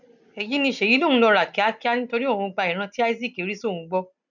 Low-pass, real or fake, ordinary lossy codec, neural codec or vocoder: 7.2 kHz; real; none; none